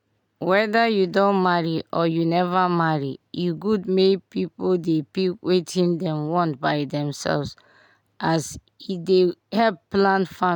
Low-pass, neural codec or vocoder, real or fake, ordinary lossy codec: 19.8 kHz; none; real; none